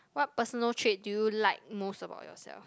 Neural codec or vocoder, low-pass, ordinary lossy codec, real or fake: none; none; none; real